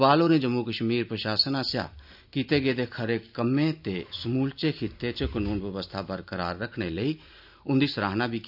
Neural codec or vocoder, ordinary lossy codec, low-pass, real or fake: none; none; 5.4 kHz; real